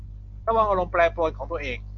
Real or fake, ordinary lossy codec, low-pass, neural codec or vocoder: real; AAC, 48 kbps; 7.2 kHz; none